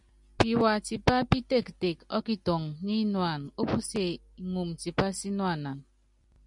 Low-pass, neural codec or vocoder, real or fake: 10.8 kHz; none; real